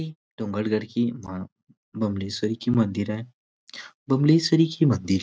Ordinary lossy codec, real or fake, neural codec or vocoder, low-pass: none; real; none; none